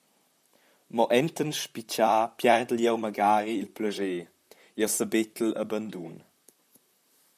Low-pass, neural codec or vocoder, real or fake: 14.4 kHz; vocoder, 44.1 kHz, 128 mel bands, Pupu-Vocoder; fake